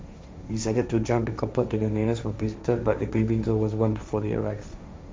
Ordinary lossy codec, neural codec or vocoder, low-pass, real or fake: none; codec, 16 kHz, 1.1 kbps, Voila-Tokenizer; none; fake